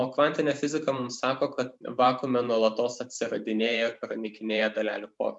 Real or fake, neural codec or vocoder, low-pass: fake; vocoder, 44.1 kHz, 128 mel bands every 512 samples, BigVGAN v2; 10.8 kHz